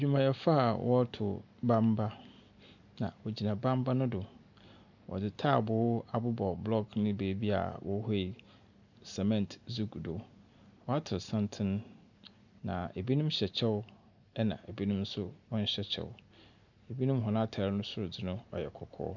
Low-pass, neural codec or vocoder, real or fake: 7.2 kHz; none; real